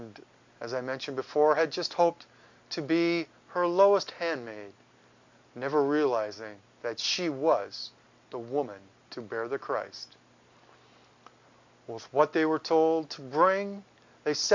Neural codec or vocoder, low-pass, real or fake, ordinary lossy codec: none; 7.2 kHz; real; MP3, 64 kbps